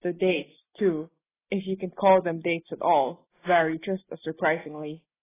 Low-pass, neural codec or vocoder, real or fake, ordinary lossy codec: 3.6 kHz; none; real; AAC, 16 kbps